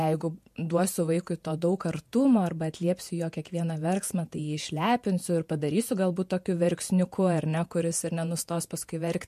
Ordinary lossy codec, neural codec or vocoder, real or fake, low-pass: MP3, 64 kbps; vocoder, 48 kHz, 128 mel bands, Vocos; fake; 14.4 kHz